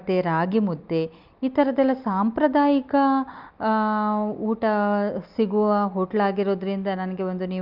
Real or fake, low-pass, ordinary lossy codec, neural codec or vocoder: real; 5.4 kHz; Opus, 32 kbps; none